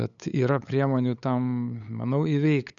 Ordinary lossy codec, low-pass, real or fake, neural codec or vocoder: MP3, 96 kbps; 7.2 kHz; fake; codec, 16 kHz, 8 kbps, FunCodec, trained on LibriTTS, 25 frames a second